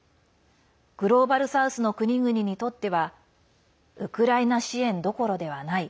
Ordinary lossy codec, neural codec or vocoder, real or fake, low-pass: none; none; real; none